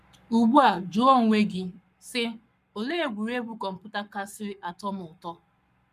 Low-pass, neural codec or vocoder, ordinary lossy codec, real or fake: 14.4 kHz; codec, 44.1 kHz, 7.8 kbps, Pupu-Codec; none; fake